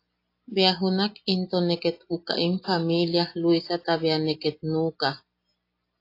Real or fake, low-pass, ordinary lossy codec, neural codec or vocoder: real; 5.4 kHz; AAC, 32 kbps; none